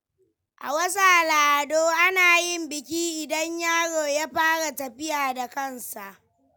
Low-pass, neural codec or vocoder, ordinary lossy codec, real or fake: none; none; none; real